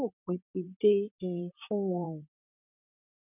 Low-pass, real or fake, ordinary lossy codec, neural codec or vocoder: 3.6 kHz; fake; none; vocoder, 44.1 kHz, 128 mel bands, Pupu-Vocoder